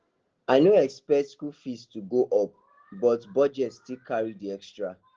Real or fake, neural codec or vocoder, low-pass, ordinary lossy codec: real; none; 7.2 kHz; Opus, 16 kbps